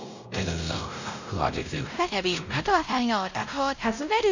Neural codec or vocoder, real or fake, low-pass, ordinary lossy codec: codec, 16 kHz, 0.5 kbps, X-Codec, WavLM features, trained on Multilingual LibriSpeech; fake; 7.2 kHz; none